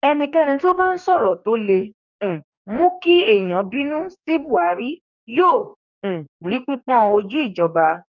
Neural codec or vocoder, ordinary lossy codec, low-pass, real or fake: codec, 44.1 kHz, 2.6 kbps, DAC; none; 7.2 kHz; fake